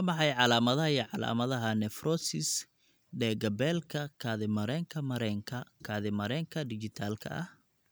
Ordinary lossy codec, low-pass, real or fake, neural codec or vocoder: none; none; real; none